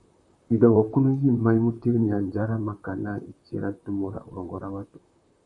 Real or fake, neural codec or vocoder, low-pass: fake; vocoder, 44.1 kHz, 128 mel bands, Pupu-Vocoder; 10.8 kHz